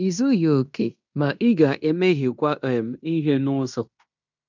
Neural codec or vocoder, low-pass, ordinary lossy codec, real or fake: codec, 16 kHz in and 24 kHz out, 0.9 kbps, LongCat-Audio-Codec, fine tuned four codebook decoder; 7.2 kHz; none; fake